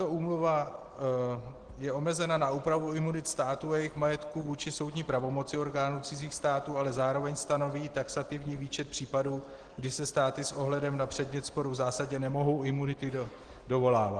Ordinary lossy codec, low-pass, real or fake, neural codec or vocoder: Opus, 16 kbps; 9.9 kHz; real; none